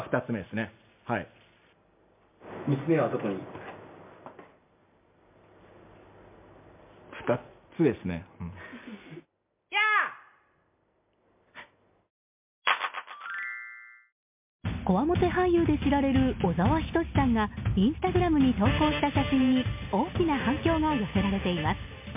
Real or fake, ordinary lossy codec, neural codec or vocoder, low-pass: real; MP3, 32 kbps; none; 3.6 kHz